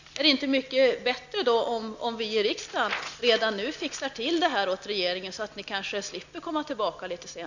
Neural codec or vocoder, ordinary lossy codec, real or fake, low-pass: none; none; real; 7.2 kHz